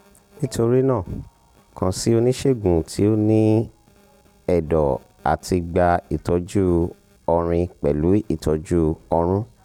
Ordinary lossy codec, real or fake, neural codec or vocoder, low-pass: none; real; none; 19.8 kHz